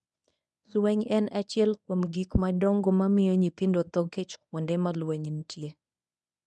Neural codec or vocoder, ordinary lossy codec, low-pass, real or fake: codec, 24 kHz, 0.9 kbps, WavTokenizer, medium speech release version 1; none; none; fake